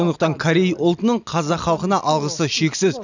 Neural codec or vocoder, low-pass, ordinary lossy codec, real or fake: none; 7.2 kHz; none; real